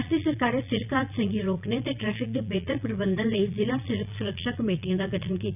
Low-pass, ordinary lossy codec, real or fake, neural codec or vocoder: 3.6 kHz; none; fake; vocoder, 22.05 kHz, 80 mel bands, Vocos